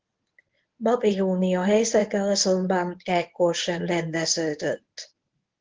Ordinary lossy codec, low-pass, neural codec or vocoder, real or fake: Opus, 16 kbps; 7.2 kHz; codec, 24 kHz, 0.9 kbps, WavTokenizer, medium speech release version 1; fake